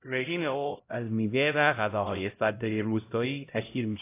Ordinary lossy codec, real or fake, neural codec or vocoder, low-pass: AAC, 24 kbps; fake; codec, 16 kHz, 0.5 kbps, X-Codec, HuBERT features, trained on LibriSpeech; 3.6 kHz